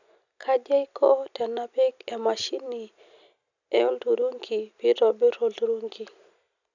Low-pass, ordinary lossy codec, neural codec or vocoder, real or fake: 7.2 kHz; none; none; real